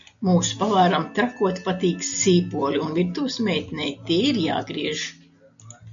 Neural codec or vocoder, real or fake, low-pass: none; real; 7.2 kHz